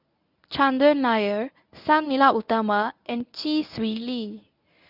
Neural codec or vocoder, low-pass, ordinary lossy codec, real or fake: codec, 24 kHz, 0.9 kbps, WavTokenizer, medium speech release version 1; 5.4 kHz; none; fake